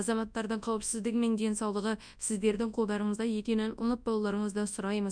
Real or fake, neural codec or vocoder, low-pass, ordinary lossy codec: fake; codec, 24 kHz, 0.9 kbps, WavTokenizer, large speech release; 9.9 kHz; none